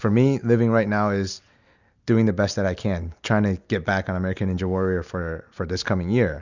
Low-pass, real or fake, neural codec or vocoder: 7.2 kHz; real; none